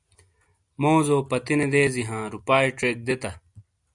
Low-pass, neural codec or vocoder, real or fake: 10.8 kHz; none; real